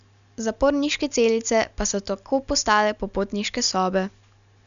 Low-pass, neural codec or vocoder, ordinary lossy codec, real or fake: 7.2 kHz; none; none; real